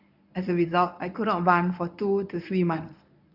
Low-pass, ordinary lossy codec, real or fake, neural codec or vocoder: 5.4 kHz; none; fake; codec, 24 kHz, 0.9 kbps, WavTokenizer, medium speech release version 1